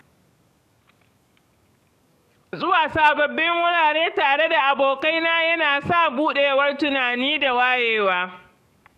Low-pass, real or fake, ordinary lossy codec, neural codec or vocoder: 14.4 kHz; fake; none; codec, 44.1 kHz, 7.8 kbps, DAC